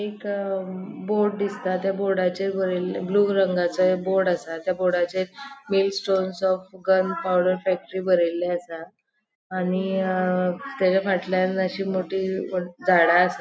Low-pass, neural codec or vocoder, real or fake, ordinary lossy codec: none; none; real; none